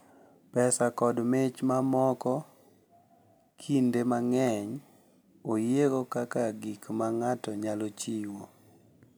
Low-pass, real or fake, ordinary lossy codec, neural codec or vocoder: none; real; none; none